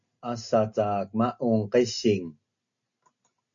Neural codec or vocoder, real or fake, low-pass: none; real; 7.2 kHz